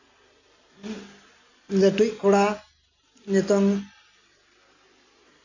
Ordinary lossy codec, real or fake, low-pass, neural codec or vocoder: none; real; 7.2 kHz; none